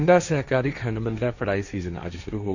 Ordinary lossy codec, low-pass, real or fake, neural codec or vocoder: none; 7.2 kHz; fake; codec, 16 kHz, 1.1 kbps, Voila-Tokenizer